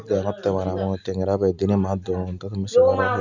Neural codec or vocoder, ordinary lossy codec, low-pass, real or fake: none; none; 7.2 kHz; real